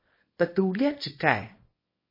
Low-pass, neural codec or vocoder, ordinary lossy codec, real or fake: 5.4 kHz; codec, 24 kHz, 0.9 kbps, WavTokenizer, small release; MP3, 24 kbps; fake